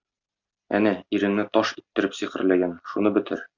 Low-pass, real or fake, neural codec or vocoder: 7.2 kHz; real; none